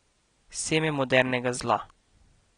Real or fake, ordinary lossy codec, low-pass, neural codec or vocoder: real; AAC, 32 kbps; 9.9 kHz; none